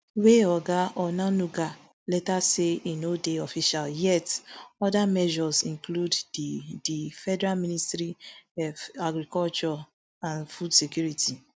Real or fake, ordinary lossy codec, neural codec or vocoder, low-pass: real; none; none; none